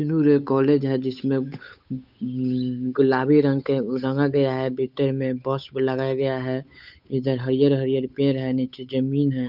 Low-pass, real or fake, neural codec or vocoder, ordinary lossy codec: 5.4 kHz; fake; codec, 16 kHz, 8 kbps, FunCodec, trained on Chinese and English, 25 frames a second; none